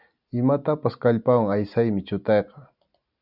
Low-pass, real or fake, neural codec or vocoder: 5.4 kHz; real; none